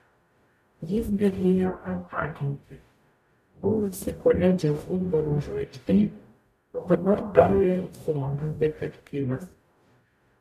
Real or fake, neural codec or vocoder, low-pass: fake; codec, 44.1 kHz, 0.9 kbps, DAC; 14.4 kHz